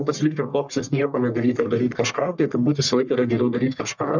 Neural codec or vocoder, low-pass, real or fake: codec, 44.1 kHz, 1.7 kbps, Pupu-Codec; 7.2 kHz; fake